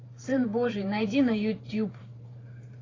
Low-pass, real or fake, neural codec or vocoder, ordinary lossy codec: 7.2 kHz; fake; vocoder, 44.1 kHz, 128 mel bands every 512 samples, BigVGAN v2; AAC, 32 kbps